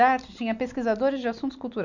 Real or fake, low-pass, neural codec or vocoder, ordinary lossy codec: real; 7.2 kHz; none; none